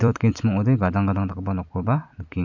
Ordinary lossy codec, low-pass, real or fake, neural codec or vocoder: MP3, 64 kbps; 7.2 kHz; fake; vocoder, 44.1 kHz, 128 mel bands every 256 samples, BigVGAN v2